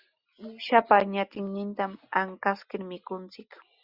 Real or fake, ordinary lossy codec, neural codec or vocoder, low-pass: real; Opus, 64 kbps; none; 5.4 kHz